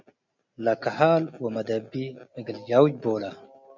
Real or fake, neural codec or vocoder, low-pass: real; none; 7.2 kHz